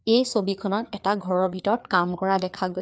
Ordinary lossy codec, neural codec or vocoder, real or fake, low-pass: none; codec, 16 kHz, 4 kbps, FreqCodec, larger model; fake; none